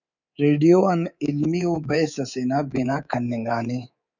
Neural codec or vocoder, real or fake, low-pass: codec, 24 kHz, 3.1 kbps, DualCodec; fake; 7.2 kHz